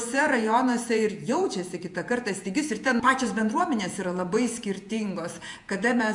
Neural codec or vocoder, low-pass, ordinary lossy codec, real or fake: vocoder, 48 kHz, 128 mel bands, Vocos; 10.8 kHz; MP3, 64 kbps; fake